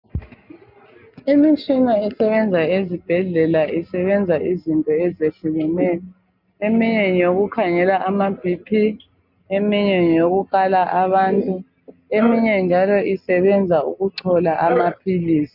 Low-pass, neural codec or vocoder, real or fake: 5.4 kHz; none; real